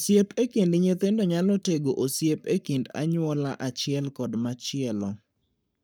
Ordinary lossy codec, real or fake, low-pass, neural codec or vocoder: none; fake; none; codec, 44.1 kHz, 7.8 kbps, Pupu-Codec